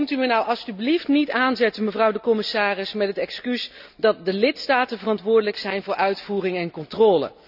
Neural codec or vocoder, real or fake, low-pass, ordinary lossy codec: none; real; 5.4 kHz; none